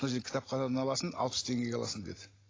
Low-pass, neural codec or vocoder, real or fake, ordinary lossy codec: 7.2 kHz; none; real; AAC, 32 kbps